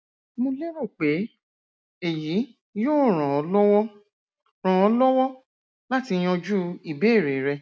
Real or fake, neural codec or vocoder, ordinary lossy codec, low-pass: real; none; none; none